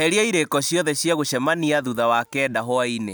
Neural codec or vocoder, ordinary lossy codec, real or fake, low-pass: none; none; real; none